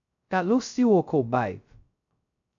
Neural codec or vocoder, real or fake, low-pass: codec, 16 kHz, 0.2 kbps, FocalCodec; fake; 7.2 kHz